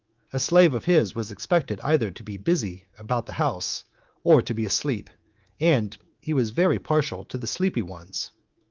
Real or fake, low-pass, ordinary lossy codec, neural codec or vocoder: fake; 7.2 kHz; Opus, 32 kbps; codec, 24 kHz, 3.1 kbps, DualCodec